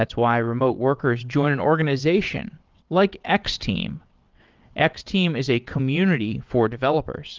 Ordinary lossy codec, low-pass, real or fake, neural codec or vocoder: Opus, 32 kbps; 7.2 kHz; fake; vocoder, 22.05 kHz, 80 mel bands, WaveNeXt